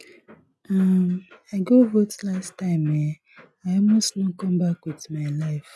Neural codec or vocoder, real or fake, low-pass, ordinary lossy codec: none; real; none; none